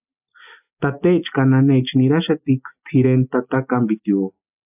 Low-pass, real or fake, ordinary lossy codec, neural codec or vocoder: 3.6 kHz; real; AAC, 32 kbps; none